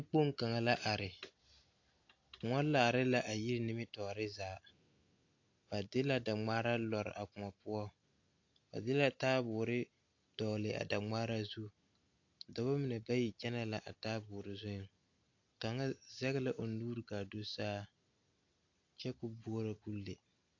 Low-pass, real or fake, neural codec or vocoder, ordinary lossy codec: 7.2 kHz; real; none; AAC, 48 kbps